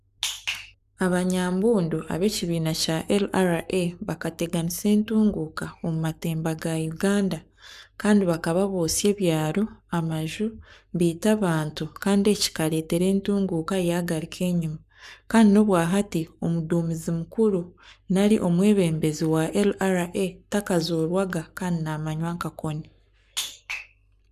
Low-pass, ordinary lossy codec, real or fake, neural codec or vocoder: 14.4 kHz; none; fake; codec, 44.1 kHz, 7.8 kbps, Pupu-Codec